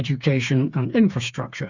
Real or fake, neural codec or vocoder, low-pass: fake; codec, 16 kHz, 4 kbps, FreqCodec, smaller model; 7.2 kHz